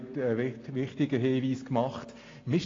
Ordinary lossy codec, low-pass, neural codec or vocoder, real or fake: AAC, 32 kbps; 7.2 kHz; none; real